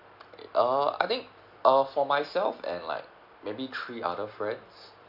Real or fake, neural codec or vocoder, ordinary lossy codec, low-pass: real; none; none; 5.4 kHz